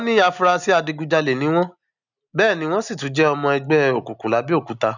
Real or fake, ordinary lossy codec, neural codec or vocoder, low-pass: real; none; none; 7.2 kHz